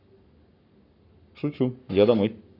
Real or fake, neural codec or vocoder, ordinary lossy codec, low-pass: fake; codec, 44.1 kHz, 7.8 kbps, Pupu-Codec; none; 5.4 kHz